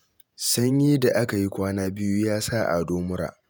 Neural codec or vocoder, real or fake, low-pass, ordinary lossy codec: vocoder, 48 kHz, 128 mel bands, Vocos; fake; none; none